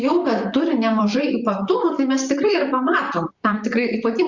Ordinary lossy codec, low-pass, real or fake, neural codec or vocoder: Opus, 64 kbps; 7.2 kHz; fake; vocoder, 44.1 kHz, 128 mel bands, Pupu-Vocoder